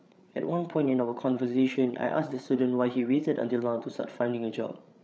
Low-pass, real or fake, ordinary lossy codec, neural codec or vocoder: none; fake; none; codec, 16 kHz, 8 kbps, FreqCodec, larger model